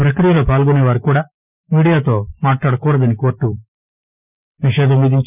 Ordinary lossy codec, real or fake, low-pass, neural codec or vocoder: none; fake; 3.6 kHz; vocoder, 44.1 kHz, 128 mel bands every 256 samples, BigVGAN v2